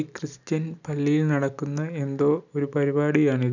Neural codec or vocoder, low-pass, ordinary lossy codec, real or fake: none; 7.2 kHz; none; real